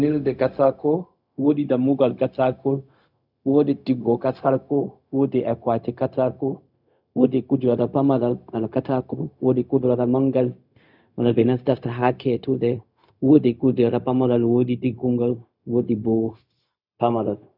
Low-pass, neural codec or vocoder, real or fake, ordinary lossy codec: 5.4 kHz; codec, 16 kHz, 0.4 kbps, LongCat-Audio-Codec; fake; none